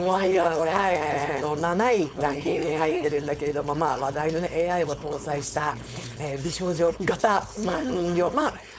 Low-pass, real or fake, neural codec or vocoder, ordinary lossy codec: none; fake; codec, 16 kHz, 4.8 kbps, FACodec; none